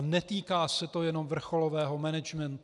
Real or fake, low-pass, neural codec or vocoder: real; 10.8 kHz; none